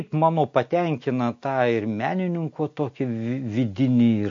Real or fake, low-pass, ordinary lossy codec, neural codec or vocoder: real; 7.2 kHz; MP3, 48 kbps; none